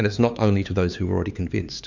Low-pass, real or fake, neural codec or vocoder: 7.2 kHz; fake; codec, 16 kHz, 4 kbps, X-Codec, HuBERT features, trained on LibriSpeech